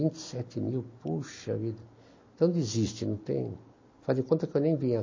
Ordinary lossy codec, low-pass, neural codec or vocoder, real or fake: MP3, 32 kbps; 7.2 kHz; none; real